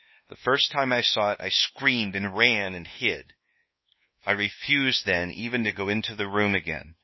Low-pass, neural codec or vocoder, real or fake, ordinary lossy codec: 7.2 kHz; codec, 24 kHz, 1.2 kbps, DualCodec; fake; MP3, 24 kbps